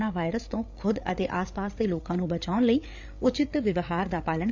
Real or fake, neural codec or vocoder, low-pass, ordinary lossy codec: fake; codec, 16 kHz, 8 kbps, FreqCodec, larger model; 7.2 kHz; none